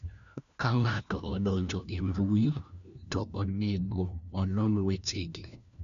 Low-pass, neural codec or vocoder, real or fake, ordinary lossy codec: 7.2 kHz; codec, 16 kHz, 1 kbps, FunCodec, trained on LibriTTS, 50 frames a second; fake; none